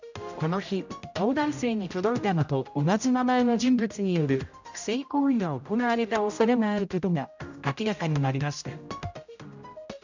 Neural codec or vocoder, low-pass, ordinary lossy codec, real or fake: codec, 16 kHz, 0.5 kbps, X-Codec, HuBERT features, trained on general audio; 7.2 kHz; none; fake